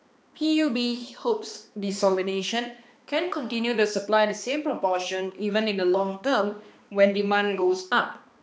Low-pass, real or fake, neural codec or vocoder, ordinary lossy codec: none; fake; codec, 16 kHz, 2 kbps, X-Codec, HuBERT features, trained on balanced general audio; none